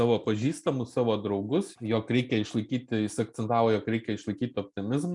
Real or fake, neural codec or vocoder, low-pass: real; none; 10.8 kHz